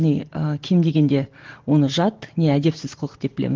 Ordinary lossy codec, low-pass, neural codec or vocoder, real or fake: Opus, 16 kbps; 7.2 kHz; none; real